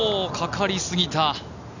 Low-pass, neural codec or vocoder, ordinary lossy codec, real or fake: 7.2 kHz; none; none; real